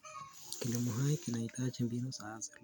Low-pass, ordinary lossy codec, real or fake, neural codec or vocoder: none; none; real; none